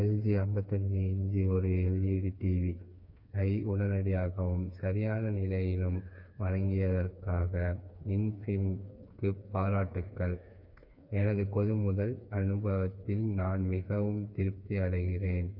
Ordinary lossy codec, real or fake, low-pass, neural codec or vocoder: none; fake; 5.4 kHz; codec, 16 kHz, 4 kbps, FreqCodec, smaller model